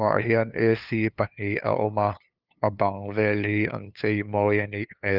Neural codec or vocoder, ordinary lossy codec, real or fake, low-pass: codec, 16 kHz, 2 kbps, FunCodec, trained on LibriTTS, 25 frames a second; Opus, 24 kbps; fake; 5.4 kHz